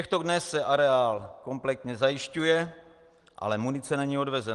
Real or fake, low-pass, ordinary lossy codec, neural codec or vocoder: real; 10.8 kHz; Opus, 24 kbps; none